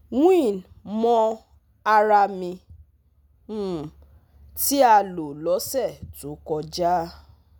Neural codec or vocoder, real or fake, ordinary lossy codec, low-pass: none; real; none; none